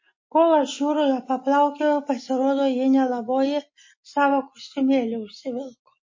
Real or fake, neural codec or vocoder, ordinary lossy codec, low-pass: real; none; MP3, 32 kbps; 7.2 kHz